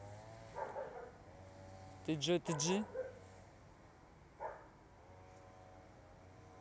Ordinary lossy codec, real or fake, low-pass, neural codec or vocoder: none; real; none; none